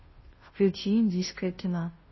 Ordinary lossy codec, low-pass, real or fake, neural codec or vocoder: MP3, 24 kbps; 7.2 kHz; fake; codec, 16 kHz, 0.5 kbps, FunCodec, trained on Chinese and English, 25 frames a second